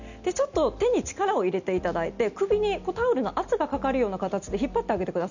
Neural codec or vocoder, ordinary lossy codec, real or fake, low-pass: none; none; real; 7.2 kHz